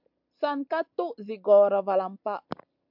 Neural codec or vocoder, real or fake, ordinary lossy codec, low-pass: none; real; MP3, 48 kbps; 5.4 kHz